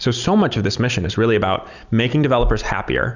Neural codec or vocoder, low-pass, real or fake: none; 7.2 kHz; real